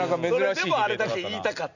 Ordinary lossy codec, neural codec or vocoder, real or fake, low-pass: none; none; real; 7.2 kHz